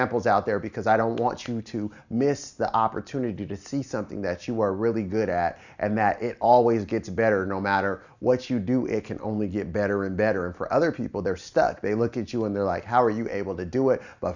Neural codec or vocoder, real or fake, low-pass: none; real; 7.2 kHz